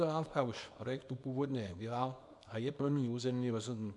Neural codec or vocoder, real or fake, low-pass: codec, 24 kHz, 0.9 kbps, WavTokenizer, small release; fake; 10.8 kHz